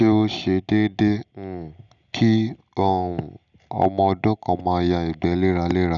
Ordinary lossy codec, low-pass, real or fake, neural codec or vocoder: none; 7.2 kHz; real; none